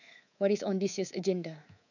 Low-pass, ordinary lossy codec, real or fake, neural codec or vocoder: 7.2 kHz; none; fake; codec, 24 kHz, 3.1 kbps, DualCodec